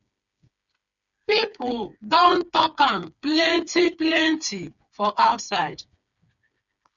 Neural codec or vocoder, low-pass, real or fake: codec, 16 kHz, 4 kbps, FreqCodec, smaller model; 7.2 kHz; fake